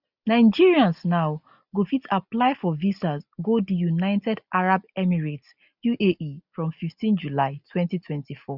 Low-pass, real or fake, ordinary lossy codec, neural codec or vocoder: 5.4 kHz; real; none; none